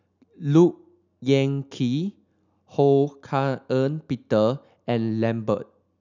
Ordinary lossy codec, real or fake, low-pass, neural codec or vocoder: none; real; 7.2 kHz; none